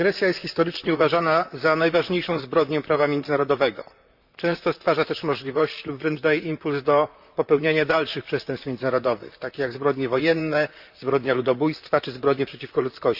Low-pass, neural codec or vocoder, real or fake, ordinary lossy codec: 5.4 kHz; vocoder, 44.1 kHz, 128 mel bands, Pupu-Vocoder; fake; Opus, 64 kbps